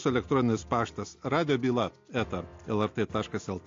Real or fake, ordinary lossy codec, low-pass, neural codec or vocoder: real; AAC, 48 kbps; 7.2 kHz; none